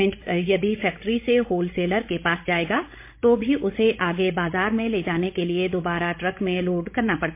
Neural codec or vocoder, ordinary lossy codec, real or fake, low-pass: codec, 16 kHz, 16 kbps, FreqCodec, larger model; MP3, 24 kbps; fake; 3.6 kHz